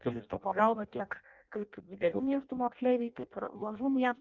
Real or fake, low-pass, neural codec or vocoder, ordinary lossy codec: fake; 7.2 kHz; codec, 16 kHz in and 24 kHz out, 0.6 kbps, FireRedTTS-2 codec; Opus, 32 kbps